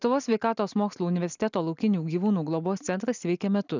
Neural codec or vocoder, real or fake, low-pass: none; real; 7.2 kHz